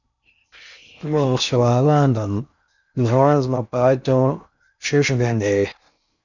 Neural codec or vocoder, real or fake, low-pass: codec, 16 kHz in and 24 kHz out, 0.6 kbps, FocalCodec, streaming, 4096 codes; fake; 7.2 kHz